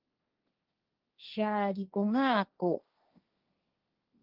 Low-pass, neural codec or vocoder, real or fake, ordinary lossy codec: 5.4 kHz; codec, 16 kHz, 1.1 kbps, Voila-Tokenizer; fake; Opus, 32 kbps